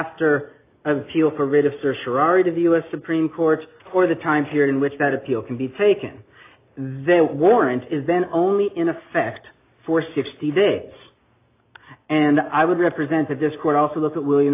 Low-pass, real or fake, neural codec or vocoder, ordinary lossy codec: 3.6 kHz; real; none; AAC, 24 kbps